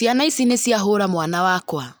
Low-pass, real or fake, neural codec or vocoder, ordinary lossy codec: none; real; none; none